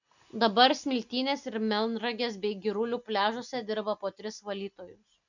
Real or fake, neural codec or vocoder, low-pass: real; none; 7.2 kHz